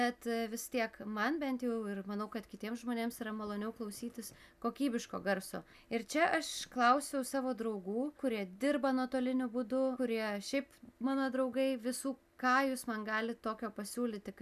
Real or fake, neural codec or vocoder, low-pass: real; none; 14.4 kHz